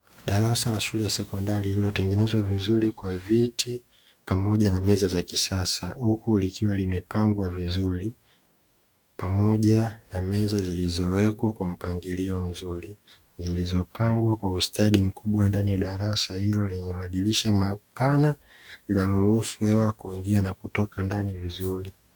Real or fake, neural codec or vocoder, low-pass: fake; codec, 44.1 kHz, 2.6 kbps, DAC; 19.8 kHz